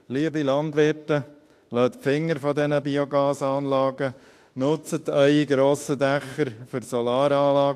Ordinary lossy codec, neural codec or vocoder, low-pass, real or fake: AAC, 64 kbps; autoencoder, 48 kHz, 32 numbers a frame, DAC-VAE, trained on Japanese speech; 14.4 kHz; fake